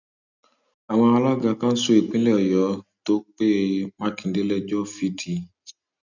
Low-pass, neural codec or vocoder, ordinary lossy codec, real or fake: 7.2 kHz; none; none; real